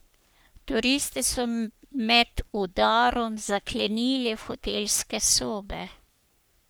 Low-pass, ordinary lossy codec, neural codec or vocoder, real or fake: none; none; codec, 44.1 kHz, 3.4 kbps, Pupu-Codec; fake